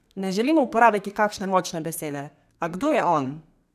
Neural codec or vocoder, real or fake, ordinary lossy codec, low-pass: codec, 32 kHz, 1.9 kbps, SNAC; fake; none; 14.4 kHz